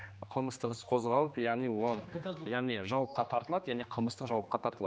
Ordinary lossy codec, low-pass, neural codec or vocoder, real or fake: none; none; codec, 16 kHz, 2 kbps, X-Codec, HuBERT features, trained on general audio; fake